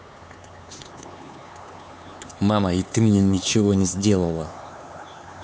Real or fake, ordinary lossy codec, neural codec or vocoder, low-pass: fake; none; codec, 16 kHz, 4 kbps, X-Codec, HuBERT features, trained on LibriSpeech; none